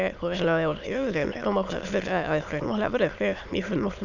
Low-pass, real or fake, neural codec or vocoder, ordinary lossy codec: 7.2 kHz; fake; autoencoder, 22.05 kHz, a latent of 192 numbers a frame, VITS, trained on many speakers; none